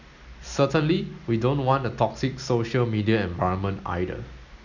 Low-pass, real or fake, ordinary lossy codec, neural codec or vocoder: 7.2 kHz; real; none; none